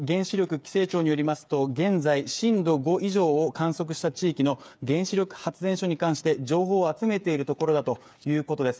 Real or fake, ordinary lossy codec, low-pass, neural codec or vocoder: fake; none; none; codec, 16 kHz, 8 kbps, FreqCodec, smaller model